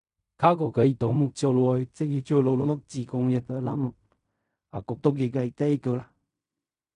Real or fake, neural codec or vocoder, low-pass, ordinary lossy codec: fake; codec, 16 kHz in and 24 kHz out, 0.4 kbps, LongCat-Audio-Codec, fine tuned four codebook decoder; 10.8 kHz; none